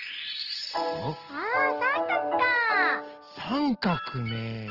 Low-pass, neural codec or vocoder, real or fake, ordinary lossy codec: 5.4 kHz; none; real; Opus, 16 kbps